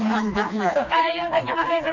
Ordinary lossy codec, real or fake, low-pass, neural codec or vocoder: none; fake; 7.2 kHz; codec, 16 kHz, 2 kbps, FreqCodec, smaller model